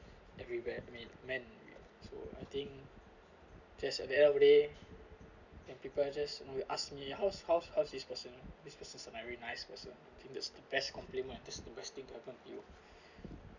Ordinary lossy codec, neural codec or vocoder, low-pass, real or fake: none; none; 7.2 kHz; real